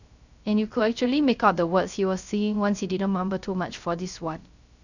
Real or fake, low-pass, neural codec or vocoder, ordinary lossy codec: fake; 7.2 kHz; codec, 16 kHz, 0.3 kbps, FocalCodec; none